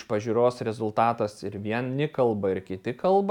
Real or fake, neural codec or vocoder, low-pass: real; none; 19.8 kHz